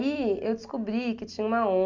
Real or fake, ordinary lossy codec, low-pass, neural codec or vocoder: real; Opus, 64 kbps; 7.2 kHz; none